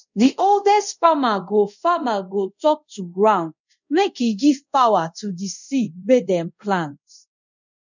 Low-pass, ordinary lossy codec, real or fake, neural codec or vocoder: 7.2 kHz; none; fake; codec, 24 kHz, 0.5 kbps, DualCodec